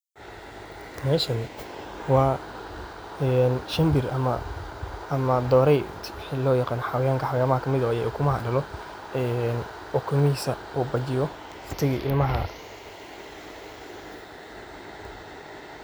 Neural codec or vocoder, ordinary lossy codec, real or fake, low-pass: none; none; real; none